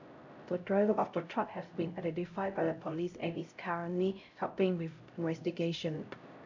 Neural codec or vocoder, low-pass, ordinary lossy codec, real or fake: codec, 16 kHz, 0.5 kbps, X-Codec, HuBERT features, trained on LibriSpeech; 7.2 kHz; MP3, 64 kbps; fake